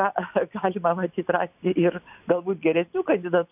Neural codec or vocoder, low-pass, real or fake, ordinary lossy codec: none; 3.6 kHz; real; AAC, 32 kbps